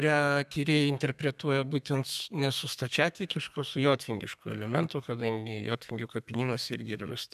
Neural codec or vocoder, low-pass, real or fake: codec, 32 kHz, 1.9 kbps, SNAC; 14.4 kHz; fake